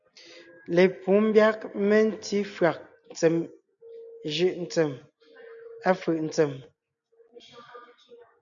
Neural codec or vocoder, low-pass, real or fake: none; 7.2 kHz; real